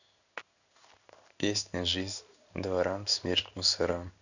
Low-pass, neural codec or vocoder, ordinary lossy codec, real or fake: 7.2 kHz; codec, 16 kHz in and 24 kHz out, 1 kbps, XY-Tokenizer; none; fake